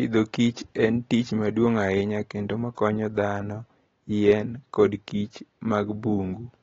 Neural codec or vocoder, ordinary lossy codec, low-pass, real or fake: none; AAC, 24 kbps; 7.2 kHz; real